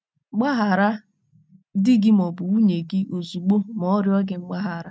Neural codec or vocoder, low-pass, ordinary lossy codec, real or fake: none; none; none; real